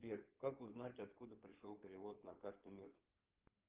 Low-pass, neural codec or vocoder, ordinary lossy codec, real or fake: 3.6 kHz; codec, 16 kHz in and 24 kHz out, 2.2 kbps, FireRedTTS-2 codec; Opus, 16 kbps; fake